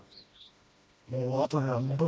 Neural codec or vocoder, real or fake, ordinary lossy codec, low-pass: codec, 16 kHz, 1 kbps, FreqCodec, smaller model; fake; none; none